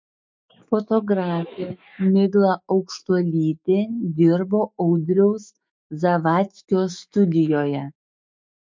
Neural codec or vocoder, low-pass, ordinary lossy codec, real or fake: autoencoder, 48 kHz, 128 numbers a frame, DAC-VAE, trained on Japanese speech; 7.2 kHz; MP3, 48 kbps; fake